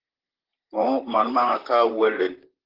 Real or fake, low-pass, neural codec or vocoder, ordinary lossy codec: fake; 5.4 kHz; vocoder, 44.1 kHz, 128 mel bands, Pupu-Vocoder; Opus, 16 kbps